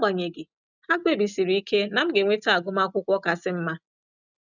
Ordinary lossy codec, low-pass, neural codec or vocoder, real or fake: none; 7.2 kHz; none; real